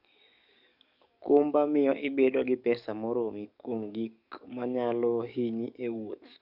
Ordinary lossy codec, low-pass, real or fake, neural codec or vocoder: none; 5.4 kHz; fake; codec, 44.1 kHz, 7.8 kbps, DAC